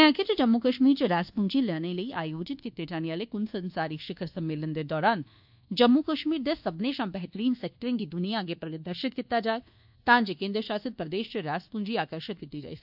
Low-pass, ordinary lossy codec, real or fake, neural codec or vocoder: 5.4 kHz; AAC, 48 kbps; fake; codec, 24 kHz, 1.2 kbps, DualCodec